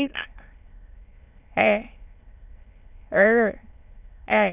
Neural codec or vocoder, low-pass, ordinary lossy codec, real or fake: autoencoder, 22.05 kHz, a latent of 192 numbers a frame, VITS, trained on many speakers; 3.6 kHz; none; fake